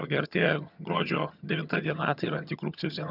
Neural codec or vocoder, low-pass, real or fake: vocoder, 22.05 kHz, 80 mel bands, HiFi-GAN; 5.4 kHz; fake